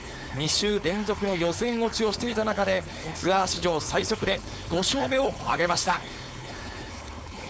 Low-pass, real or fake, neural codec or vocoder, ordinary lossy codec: none; fake; codec, 16 kHz, 4.8 kbps, FACodec; none